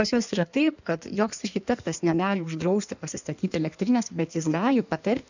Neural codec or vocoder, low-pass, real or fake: codec, 16 kHz in and 24 kHz out, 1.1 kbps, FireRedTTS-2 codec; 7.2 kHz; fake